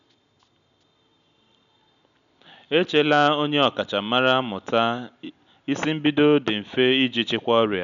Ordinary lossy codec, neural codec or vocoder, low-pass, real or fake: none; none; 7.2 kHz; real